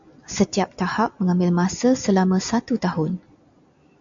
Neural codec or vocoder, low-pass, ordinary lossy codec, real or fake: none; 7.2 kHz; AAC, 64 kbps; real